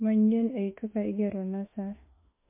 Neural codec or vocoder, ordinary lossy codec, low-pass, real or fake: autoencoder, 48 kHz, 32 numbers a frame, DAC-VAE, trained on Japanese speech; MP3, 24 kbps; 3.6 kHz; fake